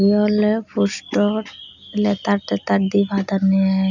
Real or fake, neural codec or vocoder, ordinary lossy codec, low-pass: real; none; none; 7.2 kHz